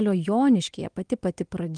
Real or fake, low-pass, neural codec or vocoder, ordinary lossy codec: real; 9.9 kHz; none; Opus, 24 kbps